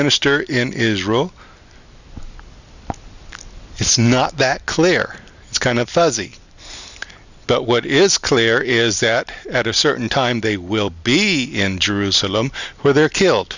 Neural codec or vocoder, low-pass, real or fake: none; 7.2 kHz; real